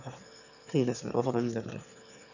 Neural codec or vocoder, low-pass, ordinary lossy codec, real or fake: autoencoder, 22.05 kHz, a latent of 192 numbers a frame, VITS, trained on one speaker; 7.2 kHz; none; fake